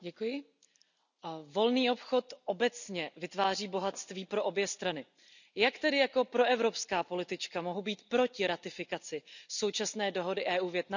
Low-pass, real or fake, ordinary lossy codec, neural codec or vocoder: 7.2 kHz; real; none; none